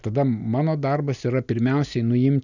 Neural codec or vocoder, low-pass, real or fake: none; 7.2 kHz; real